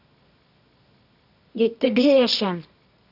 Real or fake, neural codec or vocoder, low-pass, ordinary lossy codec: fake; codec, 24 kHz, 0.9 kbps, WavTokenizer, medium music audio release; 5.4 kHz; none